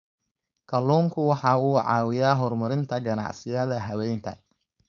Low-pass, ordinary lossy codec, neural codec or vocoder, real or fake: 7.2 kHz; none; codec, 16 kHz, 4.8 kbps, FACodec; fake